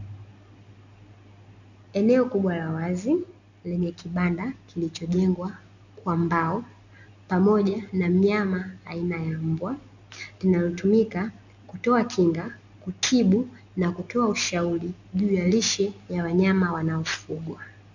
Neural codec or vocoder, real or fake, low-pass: none; real; 7.2 kHz